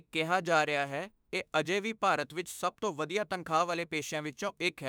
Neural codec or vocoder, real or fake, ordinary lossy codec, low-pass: autoencoder, 48 kHz, 32 numbers a frame, DAC-VAE, trained on Japanese speech; fake; none; none